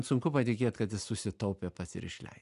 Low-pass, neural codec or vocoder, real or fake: 10.8 kHz; none; real